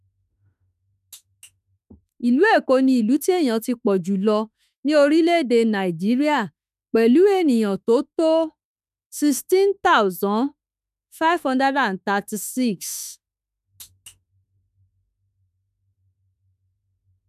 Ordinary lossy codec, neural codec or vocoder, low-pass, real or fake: none; autoencoder, 48 kHz, 32 numbers a frame, DAC-VAE, trained on Japanese speech; 14.4 kHz; fake